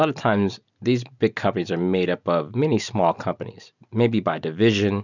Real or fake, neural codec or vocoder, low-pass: real; none; 7.2 kHz